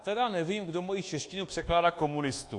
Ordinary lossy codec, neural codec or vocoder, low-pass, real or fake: AAC, 48 kbps; codec, 24 kHz, 1.2 kbps, DualCodec; 10.8 kHz; fake